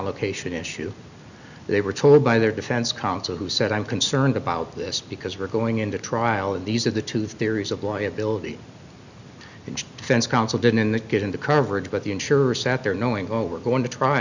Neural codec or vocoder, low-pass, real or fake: none; 7.2 kHz; real